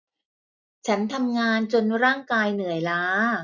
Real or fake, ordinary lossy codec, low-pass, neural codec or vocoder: real; none; 7.2 kHz; none